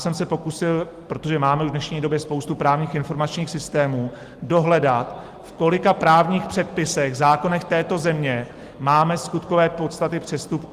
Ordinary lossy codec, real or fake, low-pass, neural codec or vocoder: Opus, 24 kbps; real; 14.4 kHz; none